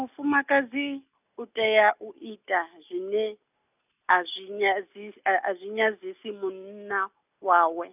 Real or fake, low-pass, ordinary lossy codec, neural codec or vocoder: real; 3.6 kHz; none; none